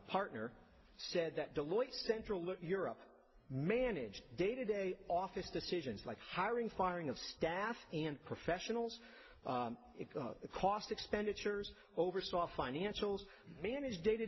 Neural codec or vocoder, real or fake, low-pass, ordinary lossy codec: none; real; 7.2 kHz; MP3, 24 kbps